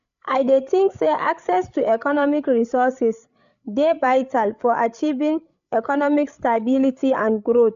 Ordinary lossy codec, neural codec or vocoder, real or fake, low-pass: none; codec, 16 kHz, 8 kbps, FreqCodec, larger model; fake; 7.2 kHz